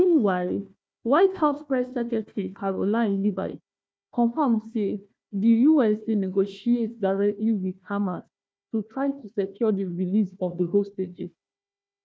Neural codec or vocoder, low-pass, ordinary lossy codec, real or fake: codec, 16 kHz, 1 kbps, FunCodec, trained on Chinese and English, 50 frames a second; none; none; fake